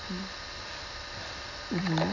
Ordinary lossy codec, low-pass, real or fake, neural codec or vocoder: none; 7.2 kHz; real; none